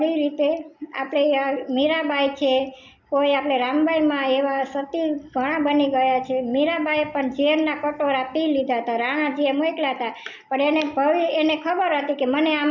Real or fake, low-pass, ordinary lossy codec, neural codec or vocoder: real; 7.2 kHz; none; none